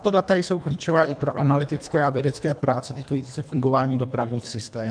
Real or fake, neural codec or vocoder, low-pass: fake; codec, 24 kHz, 1.5 kbps, HILCodec; 9.9 kHz